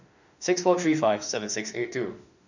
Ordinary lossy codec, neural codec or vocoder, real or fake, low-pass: none; autoencoder, 48 kHz, 32 numbers a frame, DAC-VAE, trained on Japanese speech; fake; 7.2 kHz